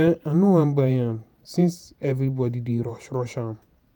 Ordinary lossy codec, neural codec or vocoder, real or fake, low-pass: none; vocoder, 48 kHz, 128 mel bands, Vocos; fake; none